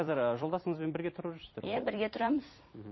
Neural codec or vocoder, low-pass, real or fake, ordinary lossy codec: vocoder, 44.1 kHz, 128 mel bands every 256 samples, BigVGAN v2; 7.2 kHz; fake; MP3, 24 kbps